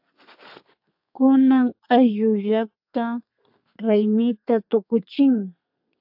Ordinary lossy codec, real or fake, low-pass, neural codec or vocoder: AAC, 48 kbps; fake; 5.4 kHz; codec, 32 kHz, 1.9 kbps, SNAC